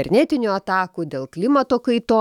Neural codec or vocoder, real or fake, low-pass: autoencoder, 48 kHz, 128 numbers a frame, DAC-VAE, trained on Japanese speech; fake; 19.8 kHz